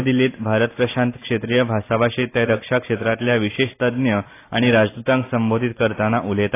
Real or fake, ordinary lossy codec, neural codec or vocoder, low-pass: real; AAC, 24 kbps; none; 3.6 kHz